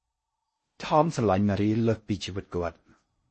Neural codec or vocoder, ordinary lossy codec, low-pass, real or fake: codec, 16 kHz in and 24 kHz out, 0.6 kbps, FocalCodec, streaming, 4096 codes; MP3, 32 kbps; 10.8 kHz; fake